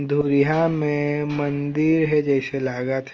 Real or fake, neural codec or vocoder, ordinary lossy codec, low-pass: real; none; Opus, 24 kbps; 7.2 kHz